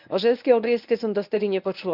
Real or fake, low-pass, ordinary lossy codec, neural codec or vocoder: fake; 5.4 kHz; none; codec, 24 kHz, 0.9 kbps, WavTokenizer, medium speech release version 1